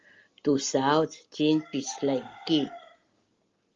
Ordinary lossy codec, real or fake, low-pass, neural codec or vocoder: Opus, 32 kbps; real; 7.2 kHz; none